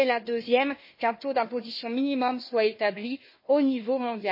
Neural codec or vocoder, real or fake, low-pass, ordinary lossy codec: codec, 16 kHz, 1 kbps, FunCodec, trained on Chinese and English, 50 frames a second; fake; 5.4 kHz; MP3, 24 kbps